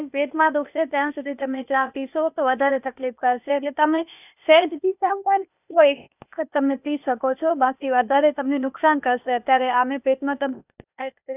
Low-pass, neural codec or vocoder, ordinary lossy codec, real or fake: 3.6 kHz; codec, 16 kHz, 0.8 kbps, ZipCodec; none; fake